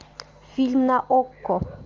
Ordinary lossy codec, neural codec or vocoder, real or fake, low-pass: Opus, 32 kbps; none; real; 7.2 kHz